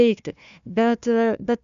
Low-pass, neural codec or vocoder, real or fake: 7.2 kHz; codec, 16 kHz, 1 kbps, FunCodec, trained on Chinese and English, 50 frames a second; fake